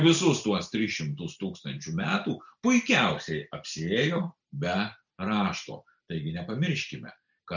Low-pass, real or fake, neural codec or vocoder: 7.2 kHz; real; none